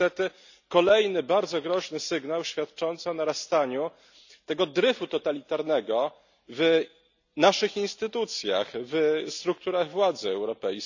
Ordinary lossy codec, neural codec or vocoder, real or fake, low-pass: none; none; real; 7.2 kHz